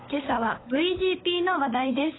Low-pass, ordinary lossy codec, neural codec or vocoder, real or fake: 7.2 kHz; AAC, 16 kbps; codec, 16 kHz, 16 kbps, FunCodec, trained on LibriTTS, 50 frames a second; fake